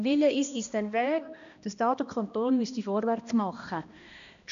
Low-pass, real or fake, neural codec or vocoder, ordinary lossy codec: 7.2 kHz; fake; codec, 16 kHz, 1 kbps, X-Codec, HuBERT features, trained on balanced general audio; none